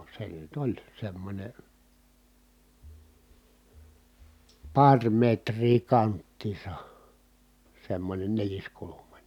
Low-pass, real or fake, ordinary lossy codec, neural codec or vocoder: 19.8 kHz; real; none; none